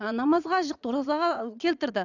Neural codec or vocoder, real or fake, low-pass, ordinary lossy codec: vocoder, 44.1 kHz, 80 mel bands, Vocos; fake; 7.2 kHz; none